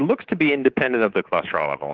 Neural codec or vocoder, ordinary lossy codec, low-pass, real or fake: codec, 24 kHz, 3.1 kbps, DualCodec; Opus, 16 kbps; 7.2 kHz; fake